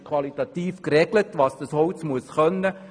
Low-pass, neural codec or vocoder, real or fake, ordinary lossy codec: 9.9 kHz; none; real; none